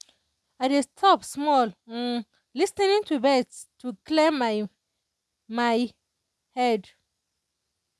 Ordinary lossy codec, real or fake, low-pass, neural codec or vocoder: none; real; none; none